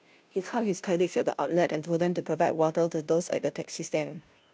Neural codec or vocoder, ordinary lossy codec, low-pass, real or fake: codec, 16 kHz, 0.5 kbps, FunCodec, trained on Chinese and English, 25 frames a second; none; none; fake